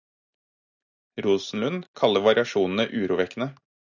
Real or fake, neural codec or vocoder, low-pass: real; none; 7.2 kHz